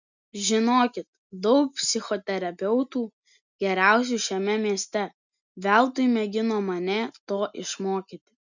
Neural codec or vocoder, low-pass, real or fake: none; 7.2 kHz; real